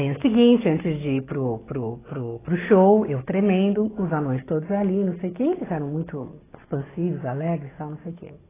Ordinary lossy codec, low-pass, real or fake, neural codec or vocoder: AAC, 16 kbps; 3.6 kHz; fake; codec, 44.1 kHz, 7.8 kbps, DAC